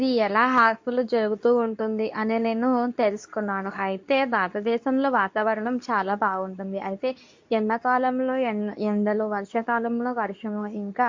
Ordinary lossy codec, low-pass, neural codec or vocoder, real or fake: MP3, 48 kbps; 7.2 kHz; codec, 24 kHz, 0.9 kbps, WavTokenizer, medium speech release version 2; fake